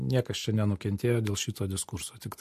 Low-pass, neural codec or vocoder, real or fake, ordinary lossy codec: 14.4 kHz; none; real; MP3, 64 kbps